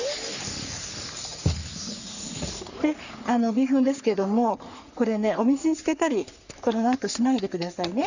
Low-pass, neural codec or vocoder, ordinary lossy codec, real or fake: 7.2 kHz; codec, 44.1 kHz, 3.4 kbps, Pupu-Codec; none; fake